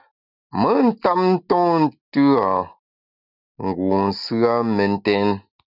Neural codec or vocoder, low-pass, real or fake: none; 5.4 kHz; real